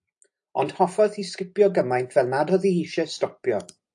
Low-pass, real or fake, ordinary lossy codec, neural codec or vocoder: 10.8 kHz; real; AAC, 64 kbps; none